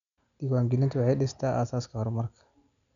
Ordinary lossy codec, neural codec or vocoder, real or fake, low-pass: none; none; real; 7.2 kHz